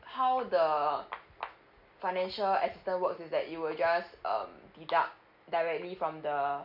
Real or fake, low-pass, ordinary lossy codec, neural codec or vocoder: real; 5.4 kHz; none; none